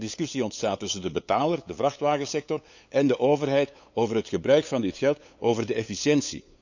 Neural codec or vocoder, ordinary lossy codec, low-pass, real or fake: codec, 16 kHz, 8 kbps, FunCodec, trained on LibriTTS, 25 frames a second; none; 7.2 kHz; fake